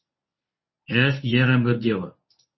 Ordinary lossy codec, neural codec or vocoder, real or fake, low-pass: MP3, 24 kbps; codec, 24 kHz, 0.9 kbps, WavTokenizer, medium speech release version 1; fake; 7.2 kHz